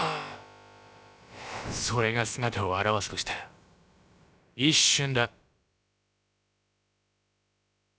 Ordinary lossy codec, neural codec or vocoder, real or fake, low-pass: none; codec, 16 kHz, about 1 kbps, DyCAST, with the encoder's durations; fake; none